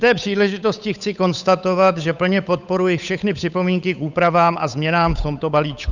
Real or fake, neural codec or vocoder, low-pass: fake; codec, 16 kHz, 8 kbps, FunCodec, trained on Chinese and English, 25 frames a second; 7.2 kHz